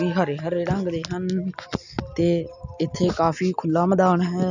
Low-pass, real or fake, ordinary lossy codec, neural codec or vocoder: 7.2 kHz; real; none; none